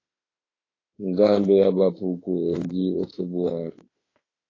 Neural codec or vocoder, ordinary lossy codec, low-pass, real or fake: autoencoder, 48 kHz, 32 numbers a frame, DAC-VAE, trained on Japanese speech; AAC, 32 kbps; 7.2 kHz; fake